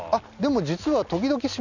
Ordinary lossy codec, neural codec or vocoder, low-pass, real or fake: none; none; 7.2 kHz; real